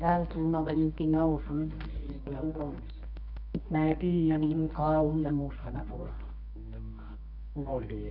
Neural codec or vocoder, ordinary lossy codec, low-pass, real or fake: codec, 24 kHz, 0.9 kbps, WavTokenizer, medium music audio release; none; 5.4 kHz; fake